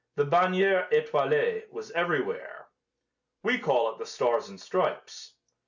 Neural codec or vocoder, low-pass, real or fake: none; 7.2 kHz; real